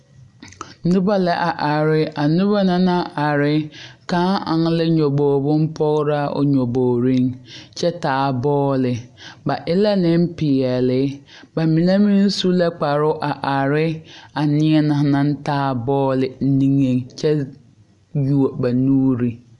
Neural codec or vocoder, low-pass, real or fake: none; 10.8 kHz; real